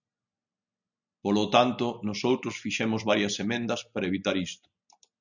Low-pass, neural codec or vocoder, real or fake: 7.2 kHz; none; real